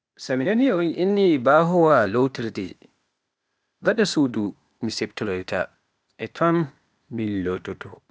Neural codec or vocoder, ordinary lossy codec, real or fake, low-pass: codec, 16 kHz, 0.8 kbps, ZipCodec; none; fake; none